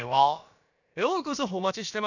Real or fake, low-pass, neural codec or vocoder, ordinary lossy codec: fake; 7.2 kHz; codec, 16 kHz, about 1 kbps, DyCAST, with the encoder's durations; none